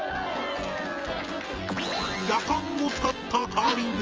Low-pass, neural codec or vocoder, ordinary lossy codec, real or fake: 7.2 kHz; vocoder, 24 kHz, 100 mel bands, Vocos; Opus, 24 kbps; fake